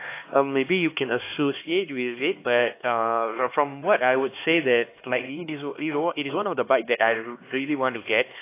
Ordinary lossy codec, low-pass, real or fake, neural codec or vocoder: AAC, 24 kbps; 3.6 kHz; fake; codec, 16 kHz, 2 kbps, X-Codec, HuBERT features, trained on LibriSpeech